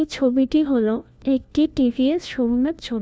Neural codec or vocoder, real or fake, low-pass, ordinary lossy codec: codec, 16 kHz, 1 kbps, FunCodec, trained on LibriTTS, 50 frames a second; fake; none; none